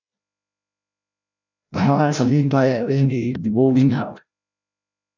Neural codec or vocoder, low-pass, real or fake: codec, 16 kHz, 0.5 kbps, FreqCodec, larger model; 7.2 kHz; fake